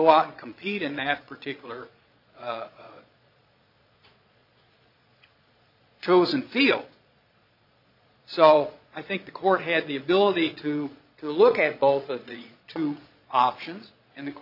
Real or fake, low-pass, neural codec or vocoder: fake; 5.4 kHz; vocoder, 22.05 kHz, 80 mel bands, Vocos